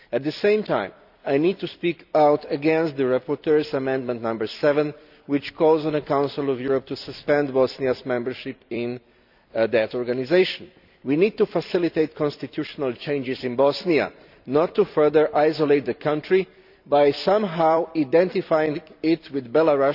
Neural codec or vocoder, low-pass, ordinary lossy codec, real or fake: vocoder, 44.1 kHz, 128 mel bands every 256 samples, BigVGAN v2; 5.4 kHz; none; fake